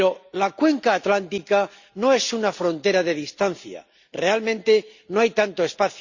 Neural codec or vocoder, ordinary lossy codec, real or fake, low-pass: none; Opus, 64 kbps; real; 7.2 kHz